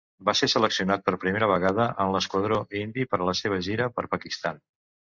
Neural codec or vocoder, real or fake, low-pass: none; real; 7.2 kHz